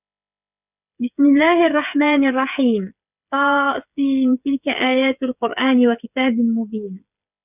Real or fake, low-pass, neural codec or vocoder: fake; 3.6 kHz; codec, 16 kHz, 8 kbps, FreqCodec, smaller model